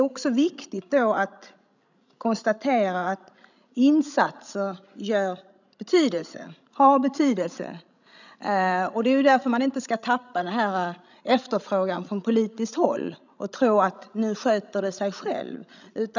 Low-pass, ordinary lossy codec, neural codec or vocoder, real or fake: 7.2 kHz; none; codec, 16 kHz, 16 kbps, FreqCodec, larger model; fake